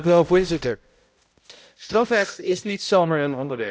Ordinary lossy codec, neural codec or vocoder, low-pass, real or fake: none; codec, 16 kHz, 0.5 kbps, X-Codec, HuBERT features, trained on balanced general audio; none; fake